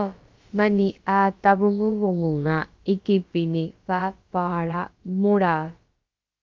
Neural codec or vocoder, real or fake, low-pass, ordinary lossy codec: codec, 16 kHz, about 1 kbps, DyCAST, with the encoder's durations; fake; 7.2 kHz; Opus, 32 kbps